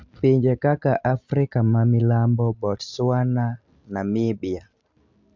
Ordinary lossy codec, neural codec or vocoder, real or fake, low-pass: MP3, 64 kbps; none; real; 7.2 kHz